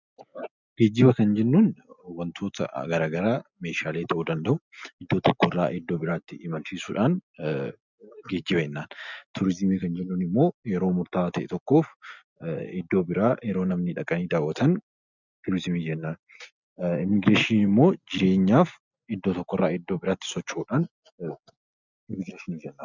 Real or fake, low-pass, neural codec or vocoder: real; 7.2 kHz; none